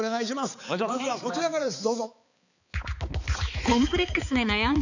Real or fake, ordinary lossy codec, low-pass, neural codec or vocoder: fake; none; 7.2 kHz; codec, 16 kHz, 4 kbps, X-Codec, HuBERT features, trained on balanced general audio